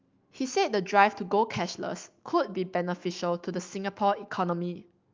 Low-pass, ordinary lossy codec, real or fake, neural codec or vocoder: 7.2 kHz; Opus, 32 kbps; fake; autoencoder, 48 kHz, 128 numbers a frame, DAC-VAE, trained on Japanese speech